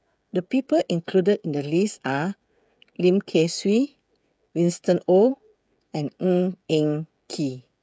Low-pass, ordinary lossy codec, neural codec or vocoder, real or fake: none; none; codec, 16 kHz, 16 kbps, FreqCodec, smaller model; fake